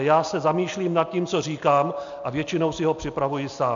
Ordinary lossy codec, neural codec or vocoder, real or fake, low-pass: AAC, 64 kbps; none; real; 7.2 kHz